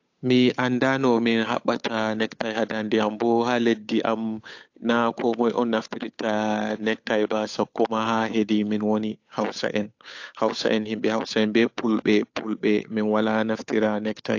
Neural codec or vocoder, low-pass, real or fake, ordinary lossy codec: codec, 16 kHz, 8 kbps, FunCodec, trained on Chinese and English, 25 frames a second; 7.2 kHz; fake; AAC, 48 kbps